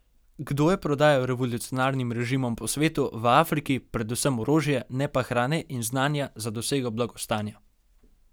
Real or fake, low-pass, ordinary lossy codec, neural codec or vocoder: real; none; none; none